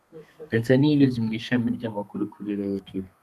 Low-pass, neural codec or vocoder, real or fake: 14.4 kHz; codec, 32 kHz, 1.9 kbps, SNAC; fake